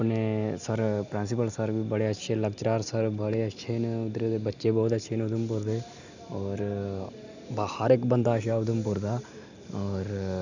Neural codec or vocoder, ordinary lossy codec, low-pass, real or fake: none; none; 7.2 kHz; real